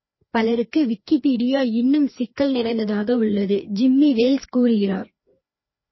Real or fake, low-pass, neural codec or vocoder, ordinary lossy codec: fake; 7.2 kHz; codec, 16 kHz, 2 kbps, FreqCodec, larger model; MP3, 24 kbps